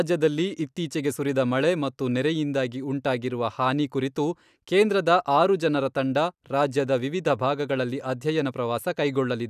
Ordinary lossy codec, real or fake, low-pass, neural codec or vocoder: none; real; 14.4 kHz; none